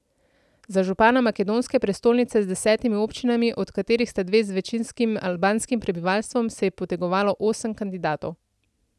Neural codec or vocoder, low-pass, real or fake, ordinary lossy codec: none; none; real; none